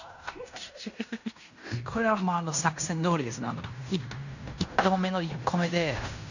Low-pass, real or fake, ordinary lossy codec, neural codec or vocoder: 7.2 kHz; fake; none; codec, 16 kHz in and 24 kHz out, 0.9 kbps, LongCat-Audio-Codec, fine tuned four codebook decoder